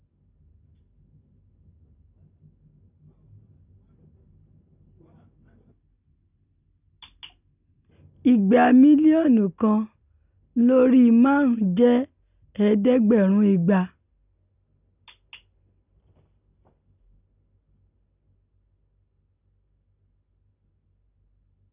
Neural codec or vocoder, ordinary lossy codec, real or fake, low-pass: none; none; real; 3.6 kHz